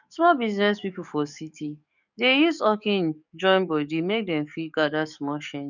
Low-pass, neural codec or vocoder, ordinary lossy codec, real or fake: 7.2 kHz; codec, 44.1 kHz, 7.8 kbps, DAC; none; fake